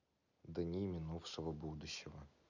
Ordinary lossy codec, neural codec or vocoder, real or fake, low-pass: none; none; real; 7.2 kHz